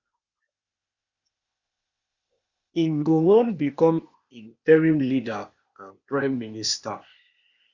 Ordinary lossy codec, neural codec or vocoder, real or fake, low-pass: Opus, 64 kbps; codec, 16 kHz, 0.8 kbps, ZipCodec; fake; 7.2 kHz